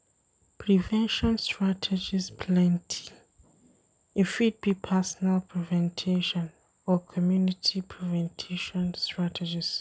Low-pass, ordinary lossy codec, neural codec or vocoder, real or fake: none; none; none; real